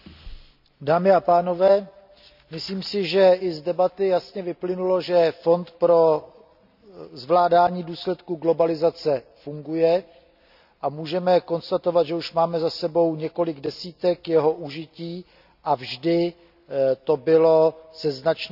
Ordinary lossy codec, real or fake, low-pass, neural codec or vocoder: none; real; 5.4 kHz; none